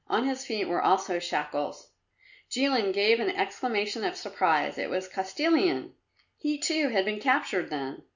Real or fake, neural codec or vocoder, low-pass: real; none; 7.2 kHz